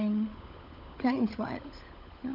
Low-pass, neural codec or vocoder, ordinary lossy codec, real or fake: 5.4 kHz; codec, 16 kHz, 16 kbps, FunCodec, trained on LibriTTS, 50 frames a second; none; fake